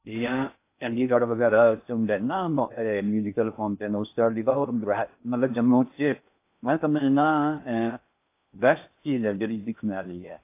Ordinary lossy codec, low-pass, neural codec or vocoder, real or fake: AAC, 24 kbps; 3.6 kHz; codec, 16 kHz in and 24 kHz out, 0.6 kbps, FocalCodec, streaming, 2048 codes; fake